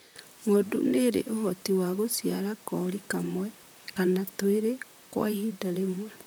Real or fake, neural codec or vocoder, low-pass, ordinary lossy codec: fake; vocoder, 44.1 kHz, 128 mel bands, Pupu-Vocoder; none; none